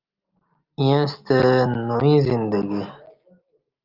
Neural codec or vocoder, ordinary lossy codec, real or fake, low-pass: none; Opus, 32 kbps; real; 5.4 kHz